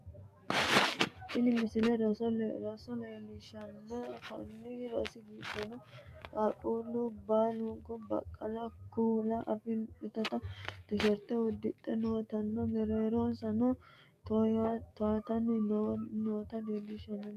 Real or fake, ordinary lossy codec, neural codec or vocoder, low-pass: fake; MP3, 96 kbps; codec, 44.1 kHz, 7.8 kbps, DAC; 14.4 kHz